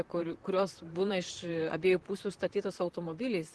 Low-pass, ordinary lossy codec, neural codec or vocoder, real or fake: 10.8 kHz; Opus, 16 kbps; vocoder, 44.1 kHz, 128 mel bands, Pupu-Vocoder; fake